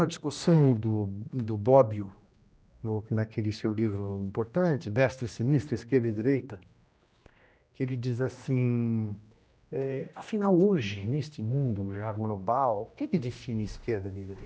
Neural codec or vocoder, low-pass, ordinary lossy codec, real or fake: codec, 16 kHz, 1 kbps, X-Codec, HuBERT features, trained on general audio; none; none; fake